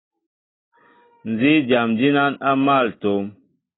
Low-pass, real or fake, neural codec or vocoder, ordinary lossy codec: 7.2 kHz; real; none; AAC, 16 kbps